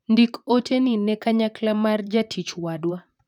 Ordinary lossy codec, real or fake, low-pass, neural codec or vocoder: none; fake; 19.8 kHz; vocoder, 44.1 kHz, 128 mel bands, Pupu-Vocoder